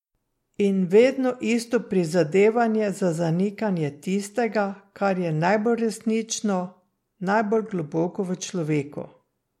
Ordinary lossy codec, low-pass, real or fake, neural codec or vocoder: MP3, 64 kbps; 19.8 kHz; real; none